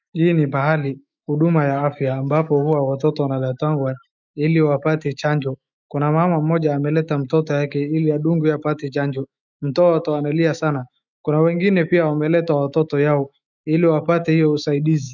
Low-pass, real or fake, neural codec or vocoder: 7.2 kHz; fake; autoencoder, 48 kHz, 128 numbers a frame, DAC-VAE, trained on Japanese speech